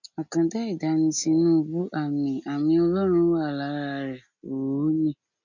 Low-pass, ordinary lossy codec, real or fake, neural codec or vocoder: 7.2 kHz; none; real; none